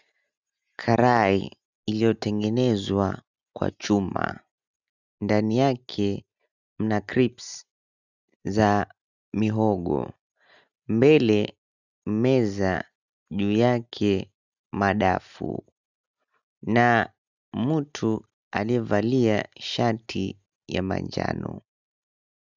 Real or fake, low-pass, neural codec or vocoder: real; 7.2 kHz; none